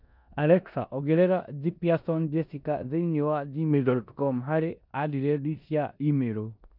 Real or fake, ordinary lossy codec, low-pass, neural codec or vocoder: fake; none; 5.4 kHz; codec, 16 kHz in and 24 kHz out, 0.9 kbps, LongCat-Audio-Codec, four codebook decoder